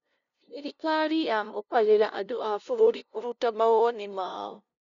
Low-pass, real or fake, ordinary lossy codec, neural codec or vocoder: 7.2 kHz; fake; none; codec, 16 kHz, 0.5 kbps, FunCodec, trained on LibriTTS, 25 frames a second